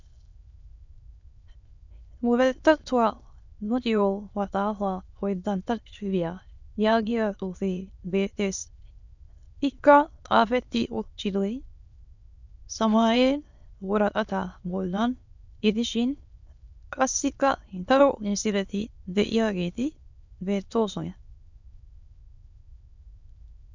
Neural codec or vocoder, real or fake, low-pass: autoencoder, 22.05 kHz, a latent of 192 numbers a frame, VITS, trained on many speakers; fake; 7.2 kHz